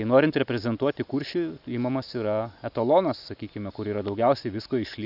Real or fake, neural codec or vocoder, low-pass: real; none; 5.4 kHz